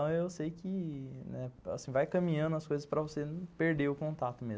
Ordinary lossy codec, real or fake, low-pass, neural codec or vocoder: none; real; none; none